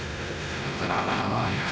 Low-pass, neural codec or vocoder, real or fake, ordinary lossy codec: none; codec, 16 kHz, 1 kbps, X-Codec, WavLM features, trained on Multilingual LibriSpeech; fake; none